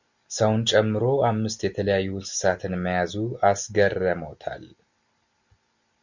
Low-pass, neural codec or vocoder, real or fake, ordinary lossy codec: 7.2 kHz; none; real; Opus, 64 kbps